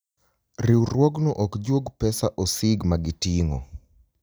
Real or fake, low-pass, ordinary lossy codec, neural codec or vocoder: real; none; none; none